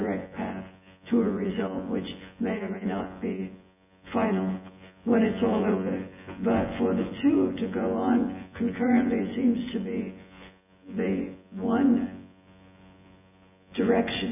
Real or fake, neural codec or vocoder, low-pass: fake; vocoder, 24 kHz, 100 mel bands, Vocos; 3.6 kHz